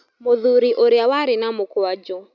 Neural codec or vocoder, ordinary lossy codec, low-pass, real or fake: none; none; 7.2 kHz; real